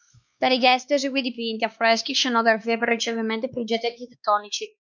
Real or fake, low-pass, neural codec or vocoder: fake; 7.2 kHz; codec, 16 kHz, 2 kbps, X-Codec, WavLM features, trained on Multilingual LibriSpeech